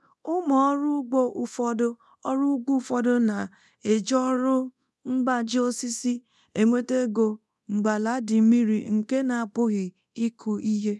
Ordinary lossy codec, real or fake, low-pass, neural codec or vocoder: none; fake; none; codec, 24 kHz, 0.9 kbps, DualCodec